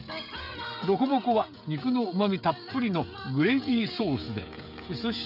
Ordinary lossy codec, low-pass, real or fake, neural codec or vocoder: none; 5.4 kHz; fake; codec, 16 kHz, 16 kbps, FreqCodec, smaller model